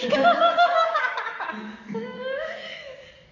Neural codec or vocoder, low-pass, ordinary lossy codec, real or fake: codec, 44.1 kHz, 7.8 kbps, DAC; 7.2 kHz; none; fake